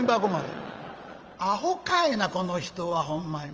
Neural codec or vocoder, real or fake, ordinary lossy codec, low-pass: vocoder, 22.05 kHz, 80 mel bands, Vocos; fake; Opus, 24 kbps; 7.2 kHz